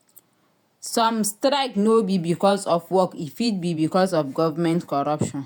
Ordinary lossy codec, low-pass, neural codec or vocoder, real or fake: none; none; vocoder, 48 kHz, 128 mel bands, Vocos; fake